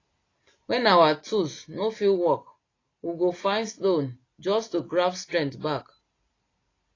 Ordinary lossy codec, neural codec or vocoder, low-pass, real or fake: AAC, 32 kbps; none; 7.2 kHz; real